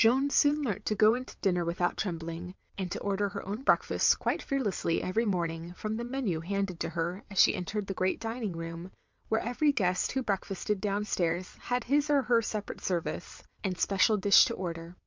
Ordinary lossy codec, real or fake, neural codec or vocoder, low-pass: MP3, 64 kbps; fake; vocoder, 22.05 kHz, 80 mel bands, WaveNeXt; 7.2 kHz